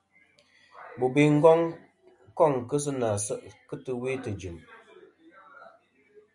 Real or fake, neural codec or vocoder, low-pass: real; none; 10.8 kHz